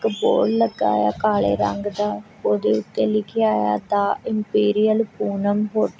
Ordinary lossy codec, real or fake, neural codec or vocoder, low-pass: none; real; none; none